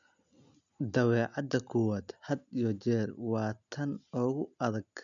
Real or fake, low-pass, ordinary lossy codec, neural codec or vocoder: real; 7.2 kHz; none; none